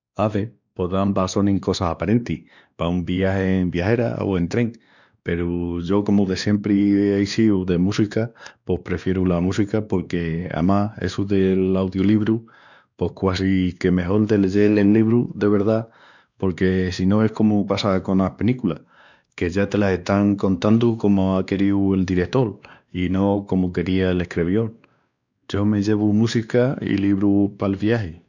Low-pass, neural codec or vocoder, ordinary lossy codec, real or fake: 7.2 kHz; codec, 16 kHz, 2 kbps, X-Codec, WavLM features, trained on Multilingual LibriSpeech; none; fake